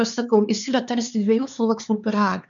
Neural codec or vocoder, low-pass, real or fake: codec, 16 kHz, 4 kbps, X-Codec, HuBERT features, trained on LibriSpeech; 7.2 kHz; fake